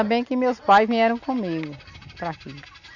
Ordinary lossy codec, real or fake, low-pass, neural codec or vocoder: none; real; 7.2 kHz; none